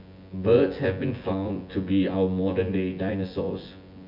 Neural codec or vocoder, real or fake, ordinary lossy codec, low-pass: vocoder, 24 kHz, 100 mel bands, Vocos; fake; MP3, 48 kbps; 5.4 kHz